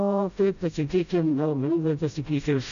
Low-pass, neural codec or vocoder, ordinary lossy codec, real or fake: 7.2 kHz; codec, 16 kHz, 0.5 kbps, FreqCodec, smaller model; AAC, 96 kbps; fake